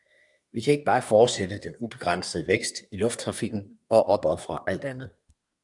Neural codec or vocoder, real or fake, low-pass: codec, 24 kHz, 1 kbps, SNAC; fake; 10.8 kHz